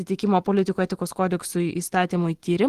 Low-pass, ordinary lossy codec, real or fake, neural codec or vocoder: 14.4 kHz; Opus, 16 kbps; real; none